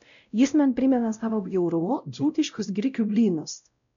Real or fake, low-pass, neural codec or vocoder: fake; 7.2 kHz; codec, 16 kHz, 0.5 kbps, X-Codec, WavLM features, trained on Multilingual LibriSpeech